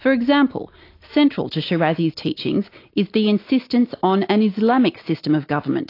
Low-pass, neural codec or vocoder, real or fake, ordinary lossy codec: 5.4 kHz; none; real; AAC, 32 kbps